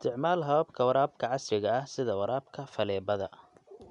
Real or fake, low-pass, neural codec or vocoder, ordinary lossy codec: real; 10.8 kHz; none; none